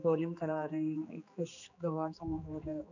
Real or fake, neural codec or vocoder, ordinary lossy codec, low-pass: fake; codec, 16 kHz, 2 kbps, X-Codec, HuBERT features, trained on general audio; none; 7.2 kHz